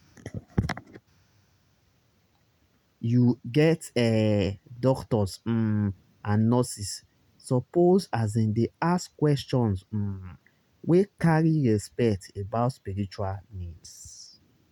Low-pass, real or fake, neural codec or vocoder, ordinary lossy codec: 19.8 kHz; real; none; none